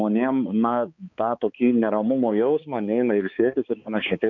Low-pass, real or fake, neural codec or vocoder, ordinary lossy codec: 7.2 kHz; fake; codec, 16 kHz, 4 kbps, X-Codec, HuBERT features, trained on balanced general audio; MP3, 64 kbps